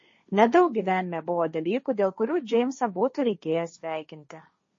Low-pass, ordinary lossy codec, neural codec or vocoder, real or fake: 7.2 kHz; MP3, 32 kbps; codec, 16 kHz, 1.1 kbps, Voila-Tokenizer; fake